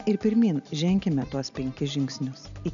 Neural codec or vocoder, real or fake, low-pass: none; real; 7.2 kHz